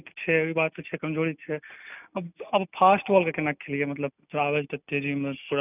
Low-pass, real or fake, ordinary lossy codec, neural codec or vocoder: 3.6 kHz; real; none; none